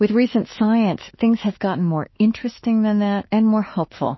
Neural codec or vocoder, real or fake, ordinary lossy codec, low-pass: autoencoder, 48 kHz, 32 numbers a frame, DAC-VAE, trained on Japanese speech; fake; MP3, 24 kbps; 7.2 kHz